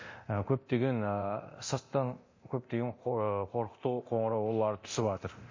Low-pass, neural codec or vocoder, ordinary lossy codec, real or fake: 7.2 kHz; codec, 24 kHz, 0.9 kbps, DualCodec; MP3, 32 kbps; fake